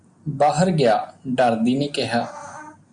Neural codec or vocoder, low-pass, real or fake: none; 9.9 kHz; real